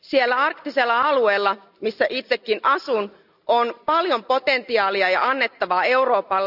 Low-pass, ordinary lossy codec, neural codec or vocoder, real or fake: 5.4 kHz; none; none; real